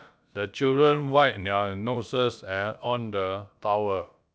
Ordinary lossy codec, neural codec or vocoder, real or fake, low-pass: none; codec, 16 kHz, about 1 kbps, DyCAST, with the encoder's durations; fake; none